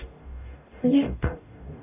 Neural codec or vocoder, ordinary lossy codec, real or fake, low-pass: codec, 44.1 kHz, 0.9 kbps, DAC; AAC, 24 kbps; fake; 3.6 kHz